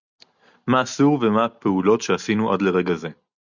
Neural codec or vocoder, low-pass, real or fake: none; 7.2 kHz; real